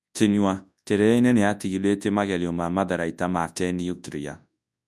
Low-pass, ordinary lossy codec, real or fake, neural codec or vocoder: none; none; fake; codec, 24 kHz, 0.9 kbps, WavTokenizer, large speech release